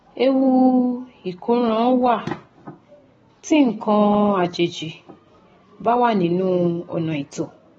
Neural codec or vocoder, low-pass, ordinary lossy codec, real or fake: none; 19.8 kHz; AAC, 24 kbps; real